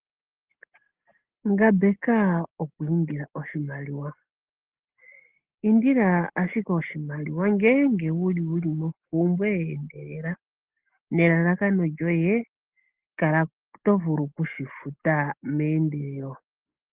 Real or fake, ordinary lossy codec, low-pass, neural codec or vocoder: real; Opus, 16 kbps; 3.6 kHz; none